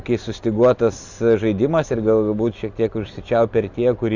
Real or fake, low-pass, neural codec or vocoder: real; 7.2 kHz; none